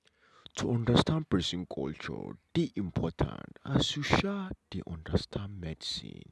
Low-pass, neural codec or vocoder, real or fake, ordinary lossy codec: none; none; real; none